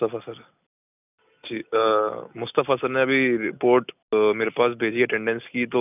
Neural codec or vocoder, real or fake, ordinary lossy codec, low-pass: none; real; none; 3.6 kHz